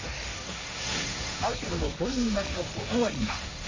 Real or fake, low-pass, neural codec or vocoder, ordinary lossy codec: fake; 7.2 kHz; codec, 16 kHz, 1.1 kbps, Voila-Tokenizer; MP3, 64 kbps